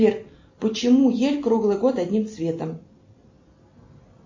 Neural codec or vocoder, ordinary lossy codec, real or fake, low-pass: none; MP3, 32 kbps; real; 7.2 kHz